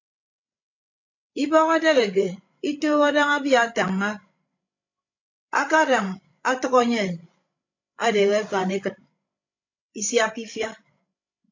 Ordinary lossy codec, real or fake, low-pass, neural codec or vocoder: AAC, 48 kbps; fake; 7.2 kHz; codec, 16 kHz, 16 kbps, FreqCodec, larger model